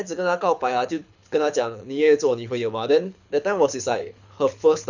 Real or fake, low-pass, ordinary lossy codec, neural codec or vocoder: fake; 7.2 kHz; none; codec, 24 kHz, 6 kbps, HILCodec